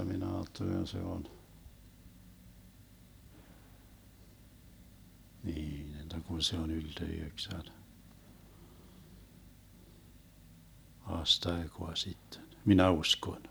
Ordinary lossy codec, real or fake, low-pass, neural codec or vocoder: none; real; none; none